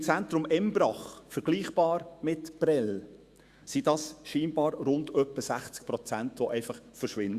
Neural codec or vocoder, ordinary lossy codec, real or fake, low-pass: autoencoder, 48 kHz, 128 numbers a frame, DAC-VAE, trained on Japanese speech; Opus, 64 kbps; fake; 14.4 kHz